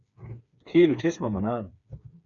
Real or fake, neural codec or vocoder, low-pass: fake; codec, 16 kHz, 4 kbps, FreqCodec, smaller model; 7.2 kHz